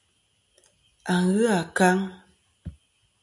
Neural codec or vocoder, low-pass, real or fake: none; 10.8 kHz; real